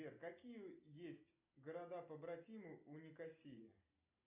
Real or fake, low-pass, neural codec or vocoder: real; 3.6 kHz; none